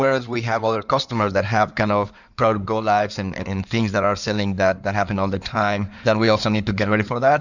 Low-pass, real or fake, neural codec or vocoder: 7.2 kHz; fake; codec, 16 kHz in and 24 kHz out, 2.2 kbps, FireRedTTS-2 codec